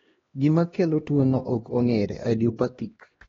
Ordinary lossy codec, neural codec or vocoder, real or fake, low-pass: AAC, 24 kbps; codec, 16 kHz, 1 kbps, X-Codec, HuBERT features, trained on LibriSpeech; fake; 7.2 kHz